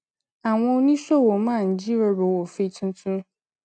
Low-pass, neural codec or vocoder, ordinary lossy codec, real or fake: 9.9 kHz; none; none; real